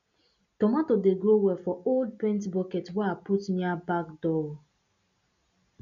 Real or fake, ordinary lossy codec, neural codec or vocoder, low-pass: real; none; none; 7.2 kHz